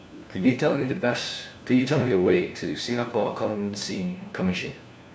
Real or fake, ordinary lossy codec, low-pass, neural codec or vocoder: fake; none; none; codec, 16 kHz, 1 kbps, FunCodec, trained on LibriTTS, 50 frames a second